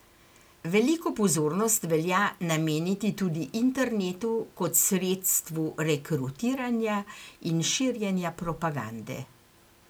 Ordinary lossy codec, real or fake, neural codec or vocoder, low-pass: none; real; none; none